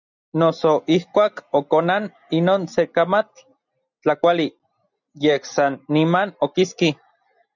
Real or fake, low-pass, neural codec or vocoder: real; 7.2 kHz; none